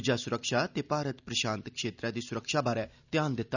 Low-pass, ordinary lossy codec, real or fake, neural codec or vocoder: 7.2 kHz; none; real; none